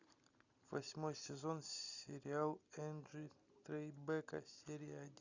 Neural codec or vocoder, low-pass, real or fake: none; 7.2 kHz; real